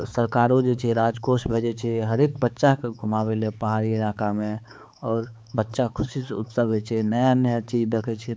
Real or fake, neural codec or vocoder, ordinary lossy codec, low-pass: fake; codec, 16 kHz, 4 kbps, X-Codec, HuBERT features, trained on balanced general audio; none; none